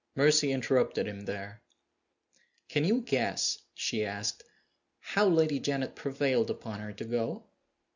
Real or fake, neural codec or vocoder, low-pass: real; none; 7.2 kHz